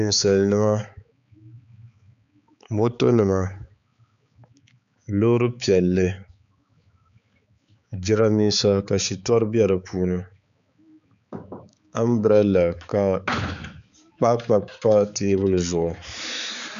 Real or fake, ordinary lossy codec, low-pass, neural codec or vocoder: fake; MP3, 96 kbps; 7.2 kHz; codec, 16 kHz, 4 kbps, X-Codec, HuBERT features, trained on balanced general audio